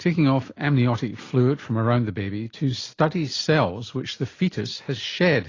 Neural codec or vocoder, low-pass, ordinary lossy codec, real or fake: none; 7.2 kHz; AAC, 32 kbps; real